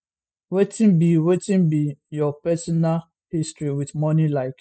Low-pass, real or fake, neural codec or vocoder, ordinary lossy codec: none; real; none; none